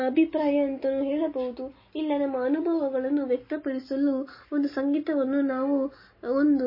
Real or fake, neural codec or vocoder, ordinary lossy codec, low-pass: real; none; MP3, 24 kbps; 5.4 kHz